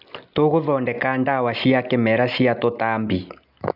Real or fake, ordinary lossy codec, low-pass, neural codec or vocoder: real; none; 5.4 kHz; none